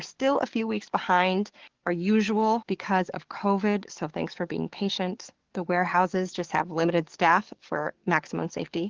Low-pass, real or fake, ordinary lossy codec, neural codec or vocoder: 7.2 kHz; fake; Opus, 16 kbps; codec, 44.1 kHz, 7.8 kbps, DAC